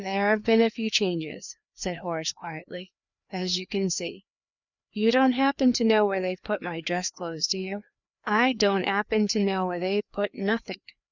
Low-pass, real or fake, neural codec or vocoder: 7.2 kHz; fake; codec, 16 kHz, 2 kbps, FreqCodec, larger model